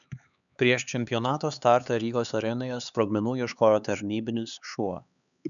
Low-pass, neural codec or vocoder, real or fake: 7.2 kHz; codec, 16 kHz, 4 kbps, X-Codec, HuBERT features, trained on LibriSpeech; fake